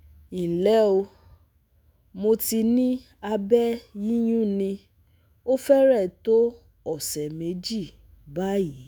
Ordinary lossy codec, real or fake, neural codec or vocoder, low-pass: none; fake; autoencoder, 48 kHz, 128 numbers a frame, DAC-VAE, trained on Japanese speech; none